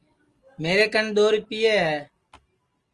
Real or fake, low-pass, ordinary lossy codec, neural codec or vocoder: real; 10.8 kHz; Opus, 24 kbps; none